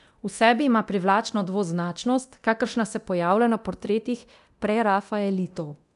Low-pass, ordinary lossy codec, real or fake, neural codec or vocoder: 10.8 kHz; none; fake; codec, 24 kHz, 0.9 kbps, DualCodec